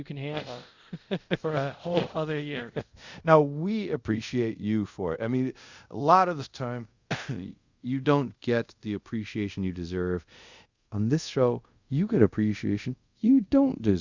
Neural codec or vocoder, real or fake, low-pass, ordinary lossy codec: codec, 24 kHz, 0.5 kbps, DualCodec; fake; 7.2 kHz; Opus, 64 kbps